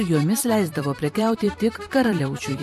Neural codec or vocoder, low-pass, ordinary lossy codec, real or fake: vocoder, 44.1 kHz, 128 mel bands every 256 samples, BigVGAN v2; 14.4 kHz; MP3, 64 kbps; fake